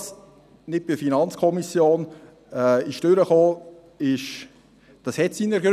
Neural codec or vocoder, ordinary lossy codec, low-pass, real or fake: none; none; 14.4 kHz; real